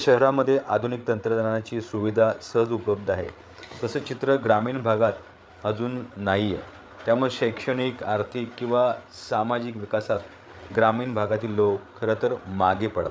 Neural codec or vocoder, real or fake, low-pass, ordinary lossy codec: codec, 16 kHz, 8 kbps, FreqCodec, larger model; fake; none; none